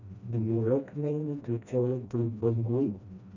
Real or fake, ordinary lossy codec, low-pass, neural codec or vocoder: fake; none; 7.2 kHz; codec, 16 kHz, 1 kbps, FreqCodec, smaller model